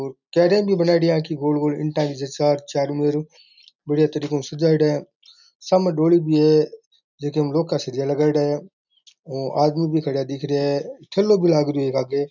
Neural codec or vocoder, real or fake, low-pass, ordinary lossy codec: none; real; 7.2 kHz; none